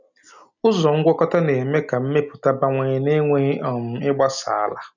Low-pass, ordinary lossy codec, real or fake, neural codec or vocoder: 7.2 kHz; none; real; none